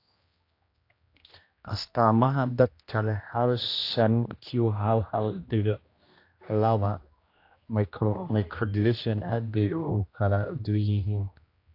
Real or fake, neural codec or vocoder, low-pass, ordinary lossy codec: fake; codec, 16 kHz, 1 kbps, X-Codec, HuBERT features, trained on general audio; 5.4 kHz; AAC, 32 kbps